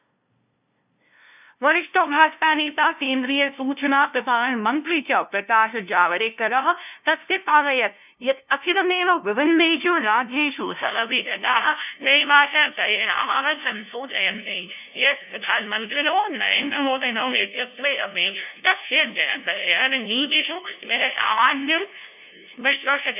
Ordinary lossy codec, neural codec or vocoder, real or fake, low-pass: none; codec, 16 kHz, 0.5 kbps, FunCodec, trained on LibriTTS, 25 frames a second; fake; 3.6 kHz